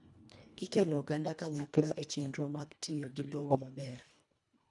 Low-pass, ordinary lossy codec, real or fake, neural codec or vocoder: 10.8 kHz; none; fake; codec, 24 kHz, 1.5 kbps, HILCodec